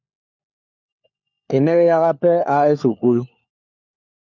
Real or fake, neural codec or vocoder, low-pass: fake; codec, 16 kHz, 4 kbps, FunCodec, trained on LibriTTS, 50 frames a second; 7.2 kHz